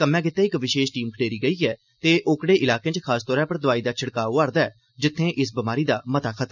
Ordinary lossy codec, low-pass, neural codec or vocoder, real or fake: none; 7.2 kHz; none; real